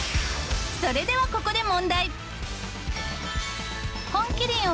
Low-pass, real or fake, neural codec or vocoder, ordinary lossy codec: none; real; none; none